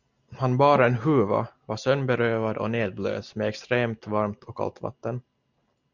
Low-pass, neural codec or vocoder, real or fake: 7.2 kHz; none; real